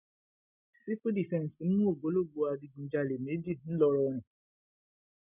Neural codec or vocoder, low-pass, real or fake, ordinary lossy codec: none; 3.6 kHz; real; none